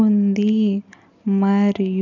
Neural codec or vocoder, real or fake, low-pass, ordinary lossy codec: none; real; 7.2 kHz; none